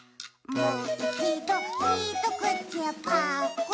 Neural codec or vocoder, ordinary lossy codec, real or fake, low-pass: none; none; real; none